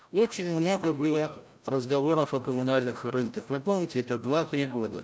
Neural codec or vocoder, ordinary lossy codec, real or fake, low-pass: codec, 16 kHz, 0.5 kbps, FreqCodec, larger model; none; fake; none